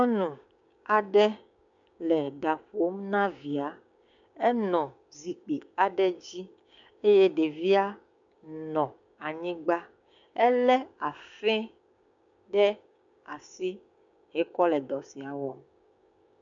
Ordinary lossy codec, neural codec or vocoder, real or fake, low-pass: MP3, 64 kbps; codec, 16 kHz, 6 kbps, DAC; fake; 7.2 kHz